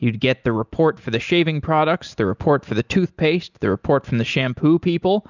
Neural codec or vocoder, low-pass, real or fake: none; 7.2 kHz; real